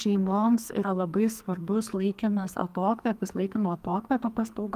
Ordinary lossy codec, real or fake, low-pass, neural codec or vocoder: Opus, 32 kbps; fake; 14.4 kHz; codec, 44.1 kHz, 2.6 kbps, SNAC